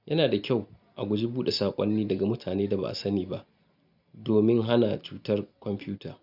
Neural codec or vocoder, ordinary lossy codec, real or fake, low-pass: none; none; real; 5.4 kHz